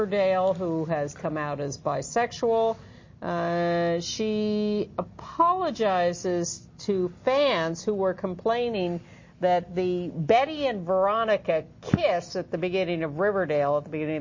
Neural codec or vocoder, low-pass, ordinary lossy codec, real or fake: none; 7.2 kHz; MP3, 32 kbps; real